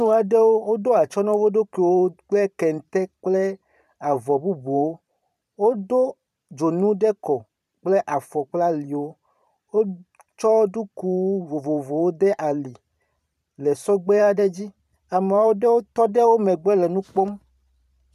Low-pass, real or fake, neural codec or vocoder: 14.4 kHz; fake; vocoder, 44.1 kHz, 128 mel bands every 512 samples, BigVGAN v2